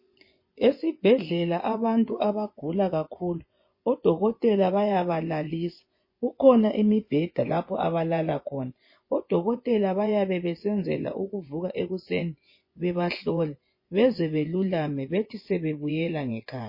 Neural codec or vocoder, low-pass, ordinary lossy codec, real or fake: vocoder, 24 kHz, 100 mel bands, Vocos; 5.4 kHz; MP3, 24 kbps; fake